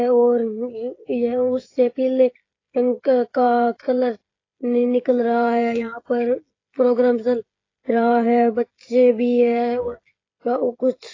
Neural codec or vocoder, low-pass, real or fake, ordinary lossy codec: codec, 16 kHz, 16 kbps, FreqCodec, smaller model; 7.2 kHz; fake; AAC, 32 kbps